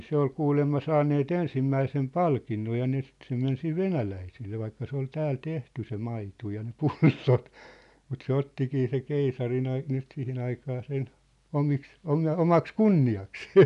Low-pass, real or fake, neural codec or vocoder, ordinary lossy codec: 10.8 kHz; real; none; none